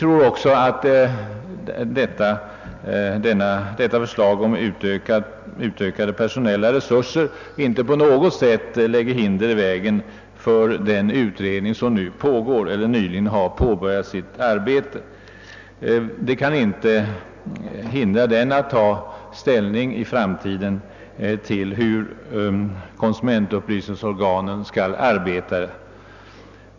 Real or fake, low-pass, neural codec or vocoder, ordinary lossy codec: real; 7.2 kHz; none; none